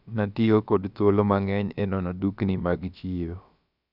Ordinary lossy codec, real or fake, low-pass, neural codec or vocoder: none; fake; 5.4 kHz; codec, 16 kHz, about 1 kbps, DyCAST, with the encoder's durations